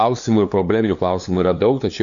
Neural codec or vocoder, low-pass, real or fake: codec, 16 kHz, 2 kbps, FunCodec, trained on LibriTTS, 25 frames a second; 7.2 kHz; fake